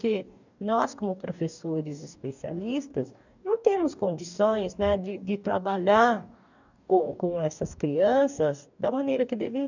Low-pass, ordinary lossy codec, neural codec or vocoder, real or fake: 7.2 kHz; none; codec, 44.1 kHz, 2.6 kbps, DAC; fake